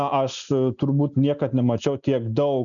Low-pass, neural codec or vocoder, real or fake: 7.2 kHz; none; real